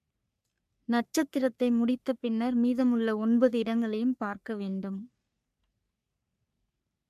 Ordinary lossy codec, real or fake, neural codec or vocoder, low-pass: MP3, 96 kbps; fake; codec, 44.1 kHz, 3.4 kbps, Pupu-Codec; 14.4 kHz